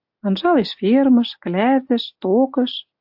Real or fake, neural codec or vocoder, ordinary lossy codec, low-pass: real; none; AAC, 48 kbps; 5.4 kHz